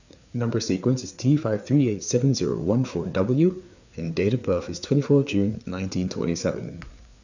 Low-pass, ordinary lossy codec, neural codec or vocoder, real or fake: 7.2 kHz; none; codec, 16 kHz, 4 kbps, FreqCodec, larger model; fake